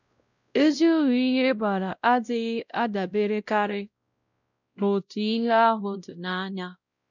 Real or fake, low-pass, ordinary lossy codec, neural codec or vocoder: fake; 7.2 kHz; none; codec, 16 kHz, 0.5 kbps, X-Codec, WavLM features, trained on Multilingual LibriSpeech